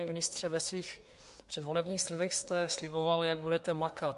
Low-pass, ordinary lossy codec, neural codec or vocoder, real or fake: 10.8 kHz; MP3, 64 kbps; codec, 24 kHz, 1 kbps, SNAC; fake